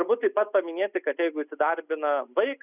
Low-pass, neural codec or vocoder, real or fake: 3.6 kHz; none; real